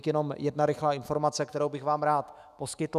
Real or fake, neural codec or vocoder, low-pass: fake; autoencoder, 48 kHz, 128 numbers a frame, DAC-VAE, trained on Japanese speech; 14.4 kHz